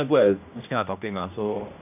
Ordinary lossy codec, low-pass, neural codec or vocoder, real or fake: AAC, 32 kbps; 3.6 kHz; codec, 16 kHz, 1 kbps, X-Codec, HuBERT features, trained on general audio; fake